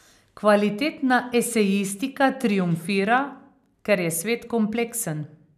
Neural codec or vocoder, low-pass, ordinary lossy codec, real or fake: none; 14.4 kHz; none; real